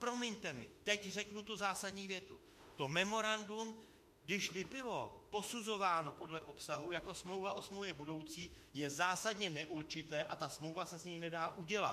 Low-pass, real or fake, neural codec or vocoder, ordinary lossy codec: 14.4 kHz; fake; autoencoder, 48 kHz, 32 numbers a frame, DAC-VAE, trained on Japanese speech; MP3, 64 kbps